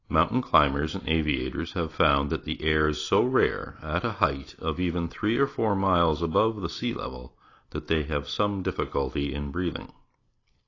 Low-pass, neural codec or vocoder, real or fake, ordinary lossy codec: 7.2 kHz; none; real; AAC, 32 kbps